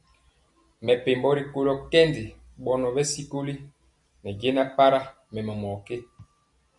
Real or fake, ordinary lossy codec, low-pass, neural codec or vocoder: real; MP3, 96 kbps; 10.8 kHz; none